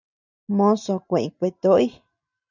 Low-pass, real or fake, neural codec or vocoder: 7.2 kHz; real; none